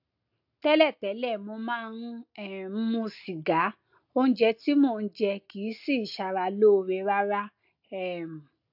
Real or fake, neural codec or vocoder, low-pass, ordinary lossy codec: real; none; 5.4 kHz; AAC, 48 kbps